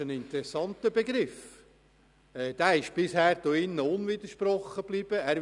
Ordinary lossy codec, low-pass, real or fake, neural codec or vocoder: none; 10.8 kHz; real; none